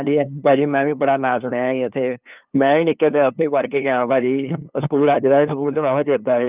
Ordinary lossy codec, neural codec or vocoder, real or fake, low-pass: Opus, 24 kbps; codec, 16 kHz, 2 kbps, FunCodec, trained on LibriTTS, 25 frames a second; fake; 3.6 kHz